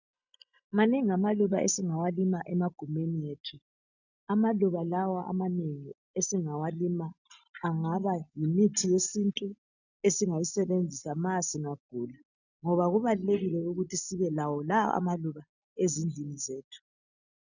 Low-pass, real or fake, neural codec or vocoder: 7.2 kHz; real; none